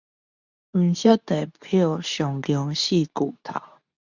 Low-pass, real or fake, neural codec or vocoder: 7.2 kHz; fake; codec, 24 kHz, 0.9 kbps, WavTokenizer, medium speech release version 2